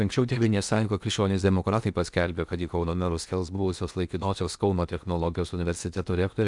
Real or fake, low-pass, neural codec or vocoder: fake; 10.8 kHz; codec, 16 kHz in and 24 kHz out, 0.8 kbps, FocalCodec, streaming, 65536 codes